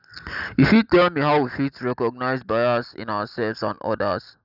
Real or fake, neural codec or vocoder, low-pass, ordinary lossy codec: real; none; 5.4 kHz; none